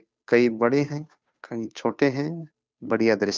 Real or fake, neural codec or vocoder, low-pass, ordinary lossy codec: fake; codec, 16 kHz, 2 kbps, FunCodec, trained on Chinese and English, 25 frames a second; none; none